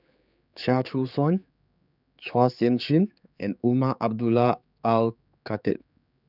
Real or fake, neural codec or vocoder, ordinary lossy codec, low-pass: fake; codec, 16 kHz, 4 kbps, X-Codec, HuBERT features, trained on general audio; none; 5.4 kHz